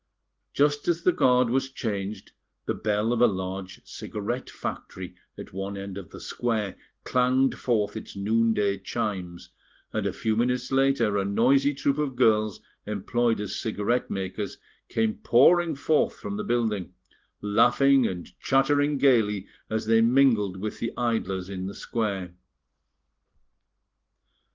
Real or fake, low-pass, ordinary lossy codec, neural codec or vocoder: real; 7.2 kHz; Opus, 16 kbps; none